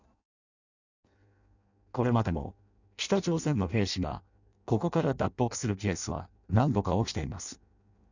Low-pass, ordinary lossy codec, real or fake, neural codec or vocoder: 7.2 kHz; none; fake; codec, 16 kHz in and 24 kHz out, 0.6 kbps, FireRedTTS-2 codec